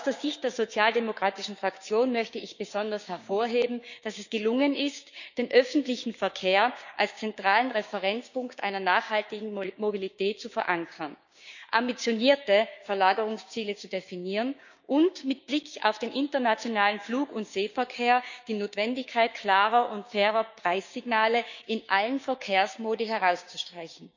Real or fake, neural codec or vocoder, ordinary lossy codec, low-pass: fake; codec, 16 kHz, 6 kbps, DAC; none; 7.2 kHz